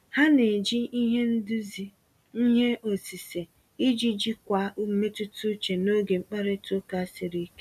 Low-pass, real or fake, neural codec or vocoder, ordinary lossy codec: 14.4 kHz; real; none; none